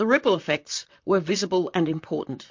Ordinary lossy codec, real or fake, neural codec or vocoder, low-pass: MP3, 48 kbps; fake; vocoder, 44.1 kHz, 128 mel bands, Pupu-Vocoder; 7.2 kHz